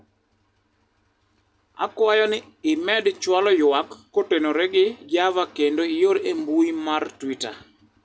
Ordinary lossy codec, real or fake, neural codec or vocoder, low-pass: none; real; none; none